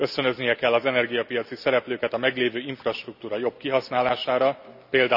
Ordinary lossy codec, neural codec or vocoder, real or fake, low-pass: none; none; real; 5.4 kHz